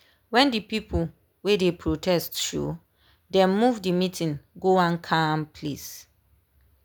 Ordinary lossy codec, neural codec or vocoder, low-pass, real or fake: none; none; none; real